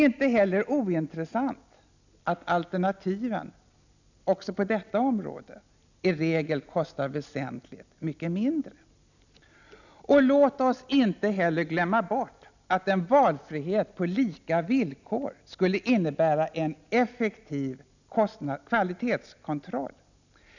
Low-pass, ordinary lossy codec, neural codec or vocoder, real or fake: 7.2 kHz; none; none; real